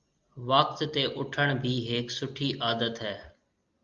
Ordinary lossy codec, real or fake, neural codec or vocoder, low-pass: Opus, 32 kbps; real; none; 7.2 kHz